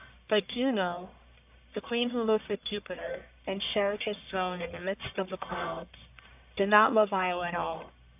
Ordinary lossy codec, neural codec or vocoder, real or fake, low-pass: AAC, 32 kbps; codec, 44.1 kHz, 1.7 kbps, Pupu-Codec; fake; 3.6 kHz